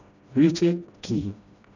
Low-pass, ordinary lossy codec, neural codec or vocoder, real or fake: 7.2 kHz; none; codec, 16 kHz, 1 kbps, FreqCodec, smaller model; fake